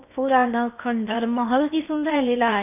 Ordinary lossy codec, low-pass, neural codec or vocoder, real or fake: none; 3.6 kHz; codec, 16 kHz in and 24 kHz out, 0.6 kbps, FocalCodec, streaming, 4096 codes; fake